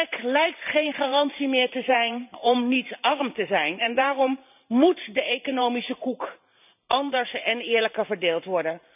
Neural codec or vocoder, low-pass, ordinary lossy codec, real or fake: vocoder, 44.1 kHz, 128 mel bands every 512 samples, BigVGAN v2; 3.6 kHz; none; fake